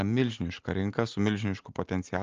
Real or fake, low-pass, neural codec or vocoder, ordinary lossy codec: real; 7.2 kHz; none; Opus, 24 kbps